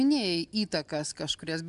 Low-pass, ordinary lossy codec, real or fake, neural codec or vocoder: 10.8 kHz; AAC, 96 kbps; real; none